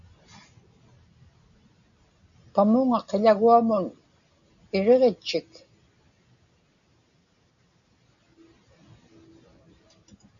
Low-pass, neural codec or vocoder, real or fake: 7.2 kHz; none; real